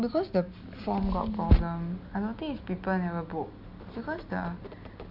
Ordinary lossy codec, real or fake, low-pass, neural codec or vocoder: Opus, 64 kbps; real; 5.4 kHz; none